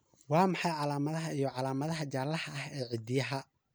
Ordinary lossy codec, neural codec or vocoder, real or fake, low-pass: none; none; real; none